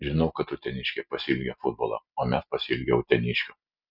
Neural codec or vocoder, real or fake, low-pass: none; real; 5.4 kHz